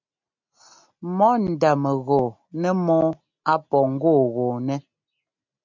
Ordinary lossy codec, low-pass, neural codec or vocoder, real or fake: MP3, 64 kbps; 7.2 kHz; none; real